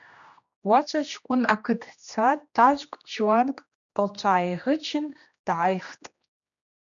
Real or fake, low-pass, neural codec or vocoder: fake; 7.2 kHz; codec, 16 kHz, 1 kbps, X-Codec, HuBERT features, trained on general audio